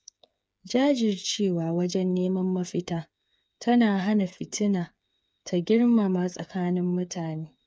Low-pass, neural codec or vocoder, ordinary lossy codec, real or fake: none; codec, 16 kHz, 8 kbps, FreqCodec, smaller model; none; fake